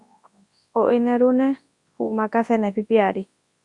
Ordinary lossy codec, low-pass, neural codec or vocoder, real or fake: Opus, 64 kbps; 10.8 kHz; codec, 24 kHz, 0.9 kbps, WavTokenizer, large speech release; fake